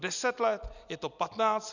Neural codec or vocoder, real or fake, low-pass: none; real; 7.2 kHz